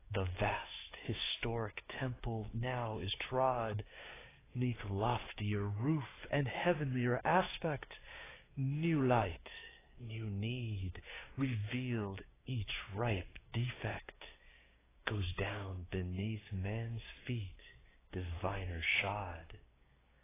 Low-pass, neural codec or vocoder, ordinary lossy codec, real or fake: 3.6 kHz; codec, 16 kHz, 6 kbps, DAC; AAC, 16 kbps; fake